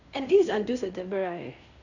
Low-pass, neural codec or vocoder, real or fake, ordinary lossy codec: 7.2 kHz; codec, 16 kHz in and 24 kHz out, 0.9 kbps, LongCat-Audio-Codec, fine tuned four codebook decoder; fake; none